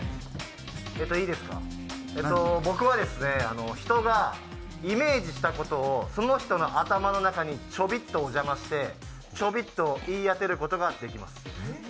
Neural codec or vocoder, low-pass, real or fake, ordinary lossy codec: none; none; real; none